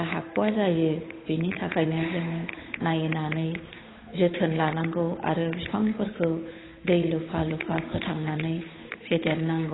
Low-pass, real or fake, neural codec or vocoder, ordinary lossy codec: 7.2 kHz; fake; codec, 16 kHz, 8 kbps, FunCodec, trained on Chinese and English, 25 frames a second; AAC, 16 kbps